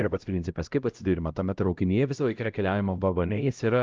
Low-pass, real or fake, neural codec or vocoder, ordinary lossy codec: 7.2 kHz; fake; codec, 16 kHz, 0.5 kbps, X-Codec, HuBERT features, trained on LibriSpeech; Opus, 24 kbps